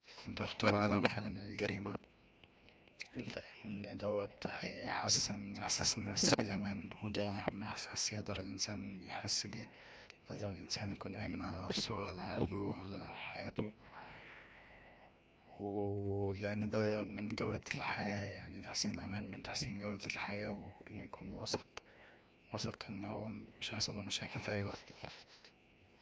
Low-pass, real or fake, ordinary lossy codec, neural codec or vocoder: none; fake; none; codec, 16 kHz, 1 kbps, FreqCodec, larger model